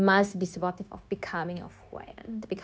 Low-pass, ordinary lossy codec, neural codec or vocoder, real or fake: none; none; codec, 16 kHz, 0.9 kbps, LongCat-Audio-Codec; fake